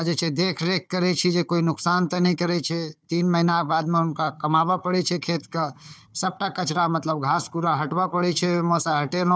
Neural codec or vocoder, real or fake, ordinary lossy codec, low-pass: codec, 16 kHz, 4 kbps, FunCodec, trained on Chinese and English, 50 frames a second; fake; none; none